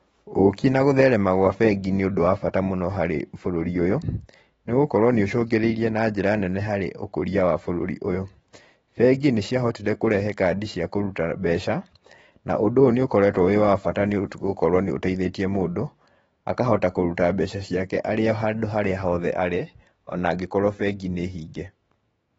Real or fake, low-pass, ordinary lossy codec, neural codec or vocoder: fake; 19.8 kHz; AAC, 24 kbps; autoencoder, 48 kHz, 128 numbers a frame, DAC-VAE, trained on Japanese speech